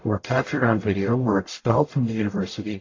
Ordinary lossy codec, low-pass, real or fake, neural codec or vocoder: AAC, 32 kbps; 7.2 kHz; fake; codec, 44.1 kHz, 0.9 kbps, DAC